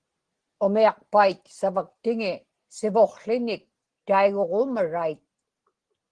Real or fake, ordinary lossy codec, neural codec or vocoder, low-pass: real; Opus, 16 kbps; none; 9.9 kHz